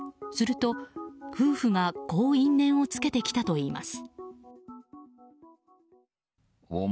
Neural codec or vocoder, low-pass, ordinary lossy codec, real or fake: none; none; none; real